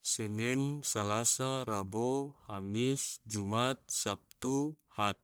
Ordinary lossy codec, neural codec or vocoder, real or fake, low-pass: none; codec, 44.1 kHz, 1.7 kbps, Pupu-Codec; fake; none